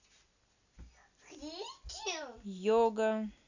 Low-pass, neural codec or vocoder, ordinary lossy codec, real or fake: 7.2 kHz; none; none; real